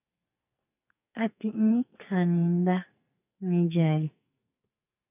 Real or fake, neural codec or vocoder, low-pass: fake; codec, 44.1 kHz, 2.6 kbps, SNAC; 3.6 kHz